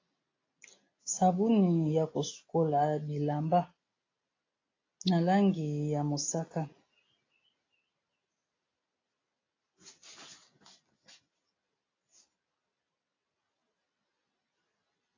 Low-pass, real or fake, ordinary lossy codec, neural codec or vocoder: 7.2 kHz; real; AAC, 32 kbps; none